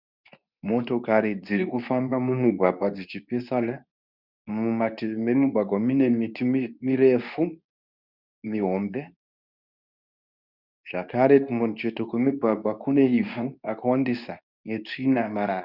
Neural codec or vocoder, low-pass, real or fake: codec, 24 kHz, 0.9 kbps, WavTokenizer, medium speech release version 2; 5.4 kHz; fake